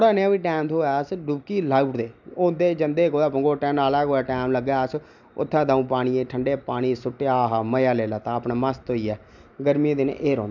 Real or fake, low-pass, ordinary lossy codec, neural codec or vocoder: real; 7.2 kHz; none; none